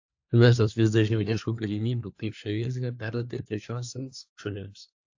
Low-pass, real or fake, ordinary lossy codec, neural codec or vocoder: 7.2 kHz; fake; AAC, 48 kbps; codec, 24 kHz, 1 kbps, SNAC